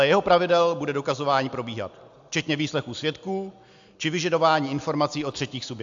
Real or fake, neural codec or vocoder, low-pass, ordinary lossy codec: real; none; 7.2 kHz; AAC, 64 kbps